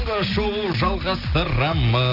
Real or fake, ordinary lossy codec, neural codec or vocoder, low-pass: real; none; none; 5.4 kHz